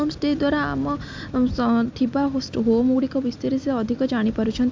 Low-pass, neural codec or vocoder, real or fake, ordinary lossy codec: 7.2 kHz; none; real; MP3, 64 kbps